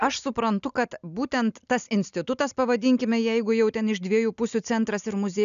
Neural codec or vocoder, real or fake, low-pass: none; real; 7.2 kHz